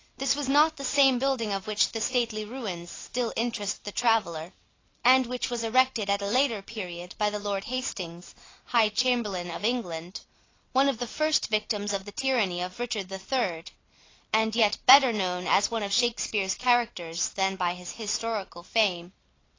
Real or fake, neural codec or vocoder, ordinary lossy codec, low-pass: real; none; AAC, 32 kbps; 7.2 kHz